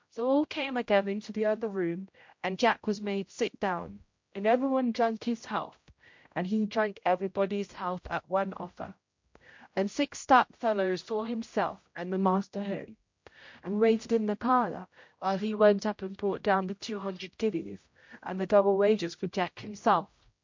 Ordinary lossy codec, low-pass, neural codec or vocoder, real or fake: MP3, 48 kbps; 7.2 kHz; codec, 16 kHz, 0.5 kbps, X-Codec, HuBERT features, trained on general audio; fake